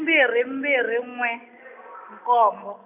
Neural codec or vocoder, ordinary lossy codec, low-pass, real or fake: none; none; 3.6 kHz; real